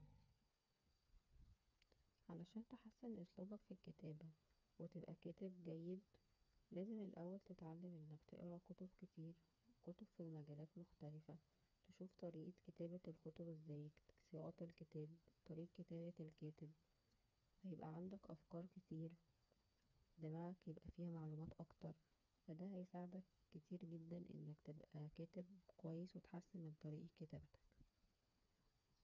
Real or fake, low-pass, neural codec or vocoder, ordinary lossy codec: fake; 7.2 kHz; codec, 16 kHz, 4 kbps, FreqCodec, smaller model; none